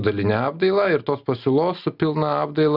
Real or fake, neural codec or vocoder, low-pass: real; none; 5.4 kHz